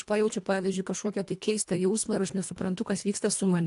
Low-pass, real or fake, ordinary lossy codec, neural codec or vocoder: 10.8 kHz; fake; AAC, 64 kbps; codec, 24 kHz, 1.5 kbps, HILCodec